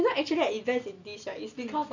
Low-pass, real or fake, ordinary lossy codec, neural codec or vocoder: 7.2 kHz; real; none; none